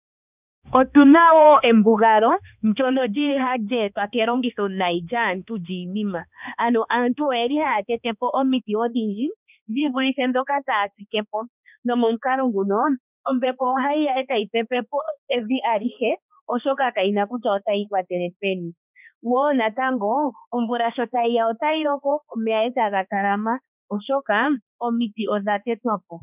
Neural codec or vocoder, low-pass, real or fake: codec, 16 kHz, 2 kbps, X-Codec, HuBERT features, trained on balanced general audio; 3.6 kHz; fake